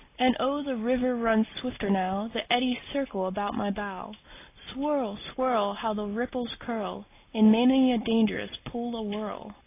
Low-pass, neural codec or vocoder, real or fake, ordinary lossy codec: 3.6 kHz; none; real; AAC, 24 kbps